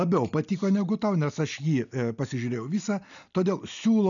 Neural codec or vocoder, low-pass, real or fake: none; 7.2 kHz; real